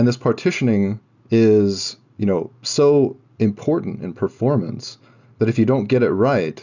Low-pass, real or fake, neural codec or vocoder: 7.2 kHz; real; none